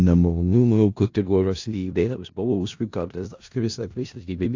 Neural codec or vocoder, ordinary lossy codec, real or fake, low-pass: codec, 16 kHz in and 24 kHz out, 0.4 kbps, LongCat-Audio-Codec, four codebook decoder; AAC, 48 kbps; fake; 7.2 kHz